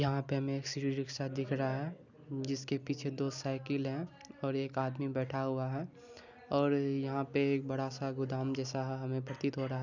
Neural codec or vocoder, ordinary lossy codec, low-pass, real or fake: none; none; 7.2 kHz; real